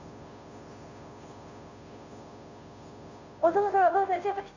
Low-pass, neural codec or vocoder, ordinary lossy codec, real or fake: 7.2 kHz; codec, 16 kHz, 0.5 kbps, FunCodec, trained on Chinese and English, 25 frames a second; none; fake